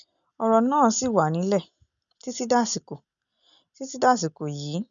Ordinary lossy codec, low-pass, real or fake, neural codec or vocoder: none; 7.2 kHz; real; none